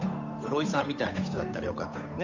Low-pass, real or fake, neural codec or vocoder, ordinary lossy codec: 7.2 kHz; fake; codec, 16 kHz, 8 kbps, FunCodec, trained on Chinese and English, 25 frames a second; Opus, 64 kbps